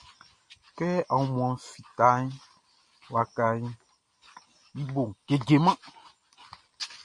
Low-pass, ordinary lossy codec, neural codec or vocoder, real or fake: 10.8 kHz; MP3, 48 kbps; none; real